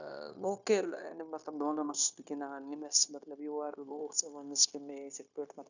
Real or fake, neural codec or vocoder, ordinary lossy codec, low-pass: fake; codec, 16 kHz, 0.9 kbps, LongCat-Audio-Codec; none; 7.2 kHz